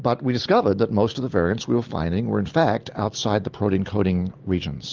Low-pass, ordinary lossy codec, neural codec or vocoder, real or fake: 7.2 kHz; Opus, 32 kbps; none; real